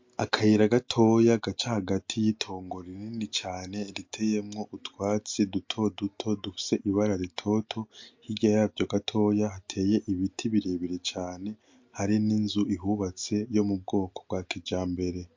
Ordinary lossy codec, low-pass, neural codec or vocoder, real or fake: MP3, 48 kbps; 7.2 kHz; none; real